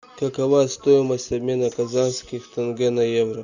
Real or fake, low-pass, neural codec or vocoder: real; 7.2 kHz; none